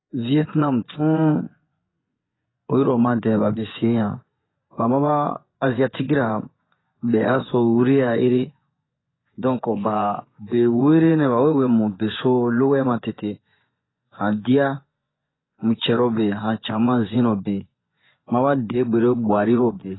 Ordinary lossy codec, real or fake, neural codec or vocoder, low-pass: AAC, 16 kbps; fake; vocoder, 24 kHz, 100 mel bands, Vocos; 7.2 kHz